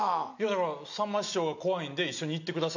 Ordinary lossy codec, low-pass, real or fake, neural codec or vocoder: none; 7.2 kHz; real; none